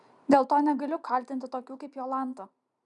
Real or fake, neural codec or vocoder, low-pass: real; none; 10.8 kHz